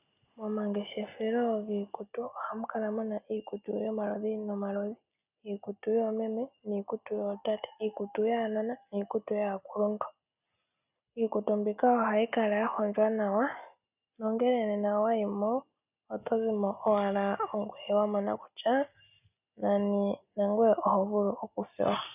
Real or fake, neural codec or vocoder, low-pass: real; none; 3.6 kHz